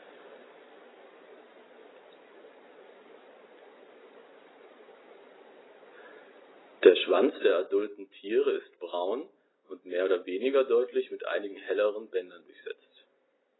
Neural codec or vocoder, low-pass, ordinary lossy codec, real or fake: none; 7.2 kHz; AAC, 16 kbps; real